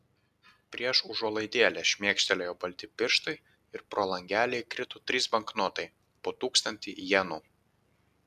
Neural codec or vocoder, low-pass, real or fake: none; 14.4 kHz; real